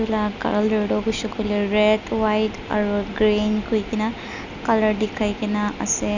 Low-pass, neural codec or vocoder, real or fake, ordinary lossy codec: 7.2 kHz; none; real; none